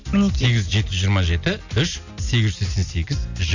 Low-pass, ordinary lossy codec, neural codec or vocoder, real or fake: 7.2 kHz; none; none; real